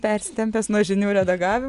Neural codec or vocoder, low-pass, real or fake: none; 10.8 kHz; real